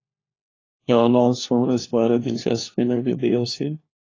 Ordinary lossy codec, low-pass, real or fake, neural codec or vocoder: AAC, 32 kbps; 7.2 kHz; fake; codec, 16 kHz, 1 kbps, FunCodec, trained on LibriTTS, 50 frames a second